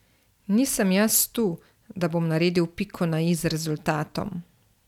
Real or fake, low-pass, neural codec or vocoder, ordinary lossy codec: real; 19.8 kHz; none; none